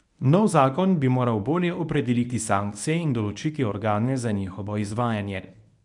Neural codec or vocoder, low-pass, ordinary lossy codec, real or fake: codec, 24 kHz, 0.9 kbps, WavTokenizer, medium speech release version 1; 10.8 kHz; none; fake